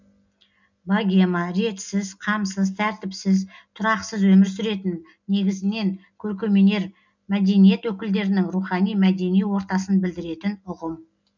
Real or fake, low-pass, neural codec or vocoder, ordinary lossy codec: real; 7.2 kHz; none; none